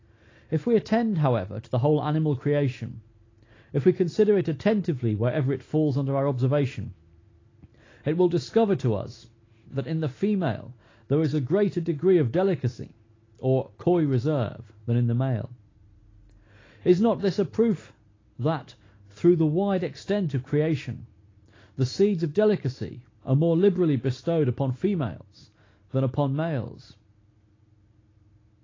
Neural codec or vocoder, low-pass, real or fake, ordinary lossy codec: none; 7.2 kHz; real; AAC, 32 kbps